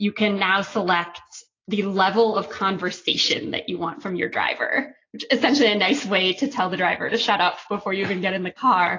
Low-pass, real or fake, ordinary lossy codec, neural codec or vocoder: 7.2 kHz; real; AAC, 32 kbps; none